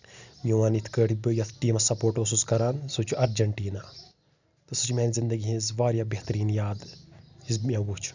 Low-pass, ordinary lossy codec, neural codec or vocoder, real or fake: 7.2 kHz; none; none; real